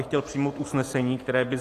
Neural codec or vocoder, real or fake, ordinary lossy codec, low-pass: none; real; AAC, 48 kbps; 14.4 kHz